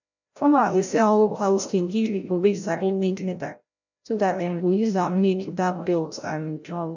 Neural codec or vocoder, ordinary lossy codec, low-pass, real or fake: codec, 16 kHz, 0.5 kbps, FreqCodec, larger model; none; 7.2 kHz; fake